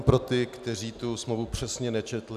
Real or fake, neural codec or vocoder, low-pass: real; none; 14.4 kHz